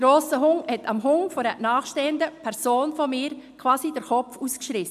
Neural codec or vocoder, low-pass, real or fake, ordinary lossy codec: none; 14.4 kHz; real; MP3, 96 kbps